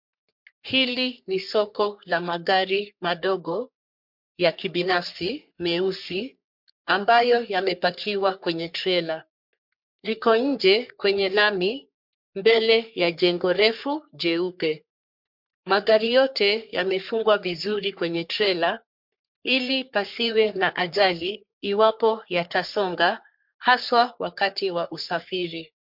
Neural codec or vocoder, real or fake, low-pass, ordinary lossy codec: codec, 44.1 kHz, 3.4 kbps, Pupu-Codec; fake; 5.4 kHz; MP3, 48 kbps